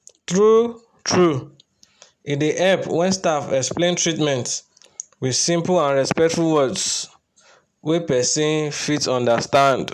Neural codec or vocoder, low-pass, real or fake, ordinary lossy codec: none; 14.4 kHz; real; none